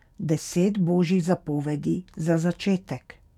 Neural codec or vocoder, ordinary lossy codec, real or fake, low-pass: codec, 44.1 kHz, 7.8 kbps, DAC; none; fake; 19.8 kHz